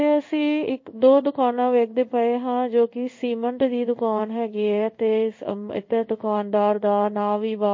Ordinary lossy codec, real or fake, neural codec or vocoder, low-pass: MP3, 32 kbps; fake; codec, 16 kHz in and 24 kHz out, 1 kbps, XY-Tokenizer; 7.2 kHz